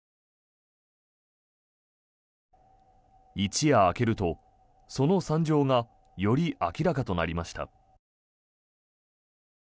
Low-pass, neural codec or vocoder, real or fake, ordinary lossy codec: none; none; real; none